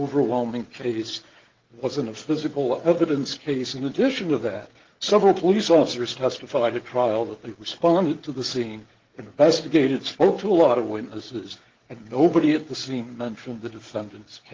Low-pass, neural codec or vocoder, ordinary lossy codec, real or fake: 7.2 kHz; codec, 16 kHz, 16 kbps, FreqCodec, smaller model; Opus, 16 kbps; fake